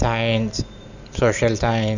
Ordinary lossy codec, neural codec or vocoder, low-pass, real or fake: none; none; 7.2 kHz; real